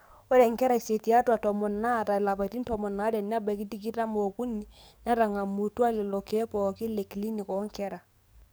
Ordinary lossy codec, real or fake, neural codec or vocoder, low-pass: none; fake; codec, 44.1 kHz, 7.8 kbps, DAC; none